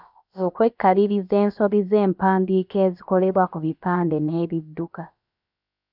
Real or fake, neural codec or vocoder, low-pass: fake; codec, 16 kHz, about 1 kbps, DyCAST, with the encoder's durations; 5.4 kHz